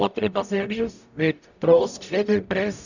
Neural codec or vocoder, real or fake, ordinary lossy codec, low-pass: codec, 44.1 kHz, 0.9 kbps, DAC; fake; none; 7.2 kHz